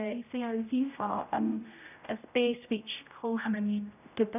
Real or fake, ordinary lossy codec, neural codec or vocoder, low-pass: fake; none; codec, 16 kHz, 0.5 kbps, X-Codec, HuBERT features, trained on general audio; 3.6 kHz